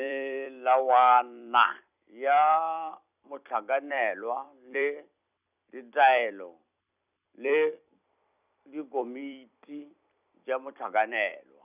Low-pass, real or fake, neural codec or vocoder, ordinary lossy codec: 3.6 kHz; fake; vocoder, 44.1 kHz, 128 mel bands every 256 samples, BigVGAN v2; none